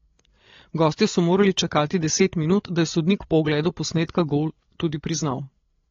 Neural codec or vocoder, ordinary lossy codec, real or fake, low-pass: codec, 16 kHz, 8 kbps, FreqCodec, larger model; AAC, 32 kbps; fake; 7.2 kHz